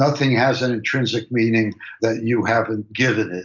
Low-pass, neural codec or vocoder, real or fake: 7.2 kHz; none; real